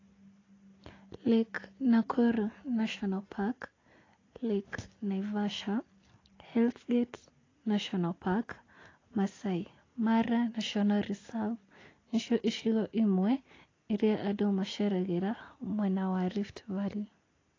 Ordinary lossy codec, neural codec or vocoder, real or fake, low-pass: AAC, 32 kbps; none; real; 7.2 kHz